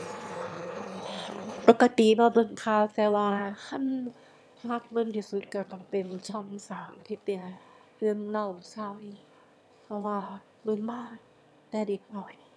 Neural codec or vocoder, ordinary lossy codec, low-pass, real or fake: autoencoder, 22.05 kHz, a latent of 192 numbers a frame, VITS, trained on one speaker; none; none; fake